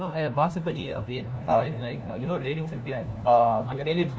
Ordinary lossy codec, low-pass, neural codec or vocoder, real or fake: none; none; codec, 16 kHz, 1 kbps, FunCodec, trained on LibriTTS, 50 frames a second; fake